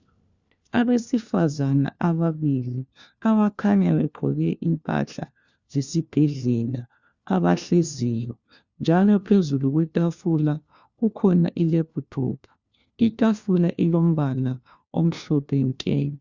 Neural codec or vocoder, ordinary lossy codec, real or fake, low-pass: codec, 16 kHz, 1 kbps, FunCodec, trained on LibriTTS, 50 frames a second; Opus, 64 kbps; fake; 7.2 kHz